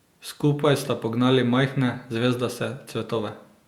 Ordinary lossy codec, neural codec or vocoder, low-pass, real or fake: Opus, 64 kbps; vocoder, 48 kHz, 128 mel bands, Vocos; 19.8 kHz; fake